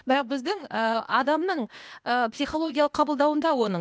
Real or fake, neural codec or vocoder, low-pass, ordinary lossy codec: fake; codec, 16 kHz, 0.8 kbps, ZipCodec; none; none